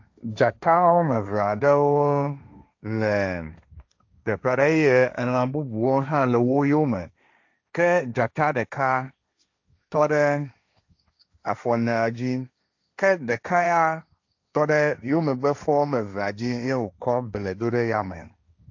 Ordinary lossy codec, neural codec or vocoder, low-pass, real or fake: AAC, 48 kbps; codec, 16 kHz, 1.1 kbps, Voila-Tokenizer; 7.2 kHz; fake